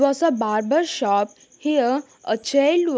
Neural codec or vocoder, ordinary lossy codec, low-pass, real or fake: none; none; none; real